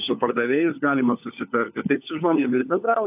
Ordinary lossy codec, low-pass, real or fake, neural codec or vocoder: AAC, 32 kbps; 3.6 kHz; fake; codec, 16 kHz, 4 kbps, FunCodec, trained on LibriTTS, 50 frames a second